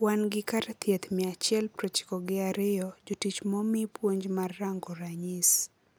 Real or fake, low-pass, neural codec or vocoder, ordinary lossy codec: real; none; none; none